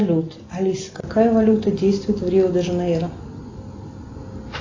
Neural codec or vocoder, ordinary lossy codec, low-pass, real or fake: none; AAC, 32 kbps; 7.2 kHz; real